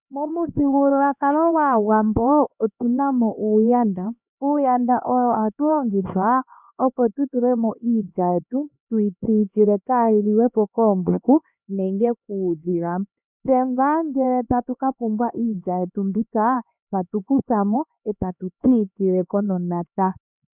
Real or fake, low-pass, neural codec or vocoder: fake; 3.6 kHz; codec, 16 kHz, 2 kbps, X-Codec, HuBERT features, trained on LibriSpeech